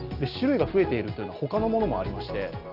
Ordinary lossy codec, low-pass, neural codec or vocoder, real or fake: Opus, 32 kbps; 5.4 kHz; none; real